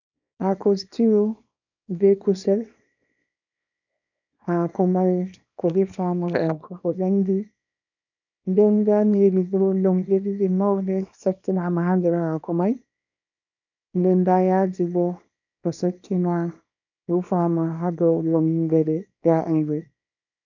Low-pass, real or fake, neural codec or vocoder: 7.2 kHz; fake; codec, 24 kHz, 0.9 kbps, WavTokenizer, small release